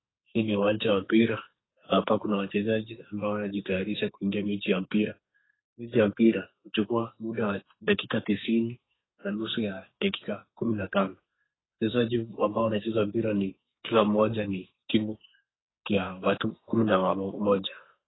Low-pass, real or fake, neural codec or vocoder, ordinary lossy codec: 7.2 kHz; fake; codec, 44.1 kHz, 2.6 kbps, SNAC; AAC, 16 kbps